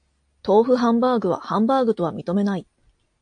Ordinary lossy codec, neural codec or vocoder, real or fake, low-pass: AAC, 64 kbps; none; real; 9.9 kHz